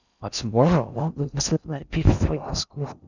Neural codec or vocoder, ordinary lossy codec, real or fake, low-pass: codec, 16 kHz in and 24 kHz out, 0.6 kbps, FocalCodec, streaming, 4096 codes; none; fake; 7.2 kHz